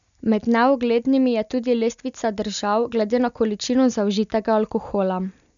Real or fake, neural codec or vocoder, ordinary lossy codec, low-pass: real; none; none; 7.2 kHz